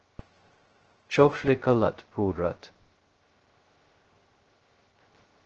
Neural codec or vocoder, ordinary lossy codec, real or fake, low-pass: codec, 16 kHz, 0.2 kbps, FocalCodec; Opus, 16 kbps; fake; 7.2 kHz